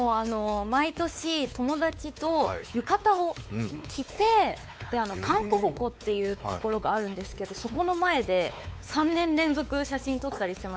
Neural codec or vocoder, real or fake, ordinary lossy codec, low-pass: codec, 16 kHz, 4 kbps, X-Codec, WavLM features, trained on Multilingual LibriSpeech; fake; none; none